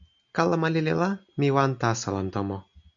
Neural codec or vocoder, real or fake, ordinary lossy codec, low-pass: none; real; MP3, 64 kbps; 7.2 kHz